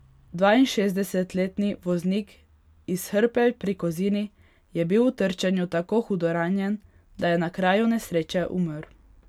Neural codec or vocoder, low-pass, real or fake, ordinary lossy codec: none; 19.8 kHz; real; none